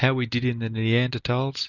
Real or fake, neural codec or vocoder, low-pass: real; none; 7.2 kHz